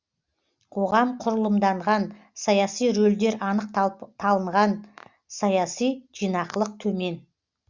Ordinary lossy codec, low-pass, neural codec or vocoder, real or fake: Opus, 64 kbps; 7.2 kHz; none; real